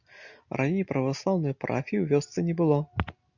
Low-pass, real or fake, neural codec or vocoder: 7.2 kHz; real; none